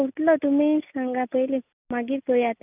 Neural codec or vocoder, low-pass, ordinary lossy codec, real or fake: none; 3.6 kHz; none; real